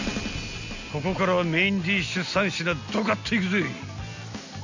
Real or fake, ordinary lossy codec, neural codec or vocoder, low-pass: real; AAC, 48 kbps; none; 7.2 kHz